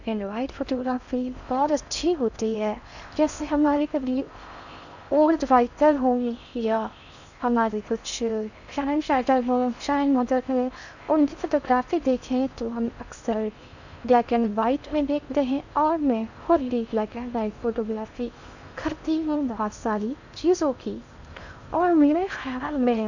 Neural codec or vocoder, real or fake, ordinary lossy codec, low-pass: codec, 16 kHz in and 24 kHz out, 0.6 kbps, FocalCodec, streaming, 4096 codes; fake; none; 7.2 kHz